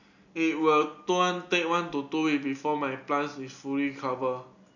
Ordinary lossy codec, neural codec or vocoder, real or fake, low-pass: none; none; real; 7.2 kHz